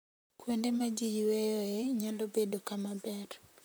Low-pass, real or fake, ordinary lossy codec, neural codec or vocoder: none; fake; none; vocoder, 44.1 kHz, 128 mel bands, Pupu-Vocoder